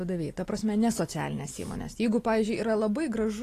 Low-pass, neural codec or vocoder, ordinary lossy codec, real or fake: 14.4 kHz; none; AAC, 48 kbps; real